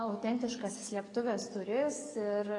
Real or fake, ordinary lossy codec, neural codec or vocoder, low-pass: fake; AAC, 32 kbps; codec, 44.1 kHz, 7.8 kbps, DAC; 10.8 kHz